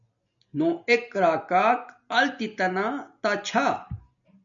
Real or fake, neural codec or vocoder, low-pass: real; none; 7.2 kHz